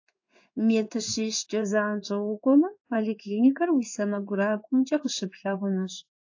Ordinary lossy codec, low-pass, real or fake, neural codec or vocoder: AAC, 48 kbps; 7.2 kHz; fake; codec, 16 kHz in and 24 kHz out, 1 kbps, XY-Tokenizer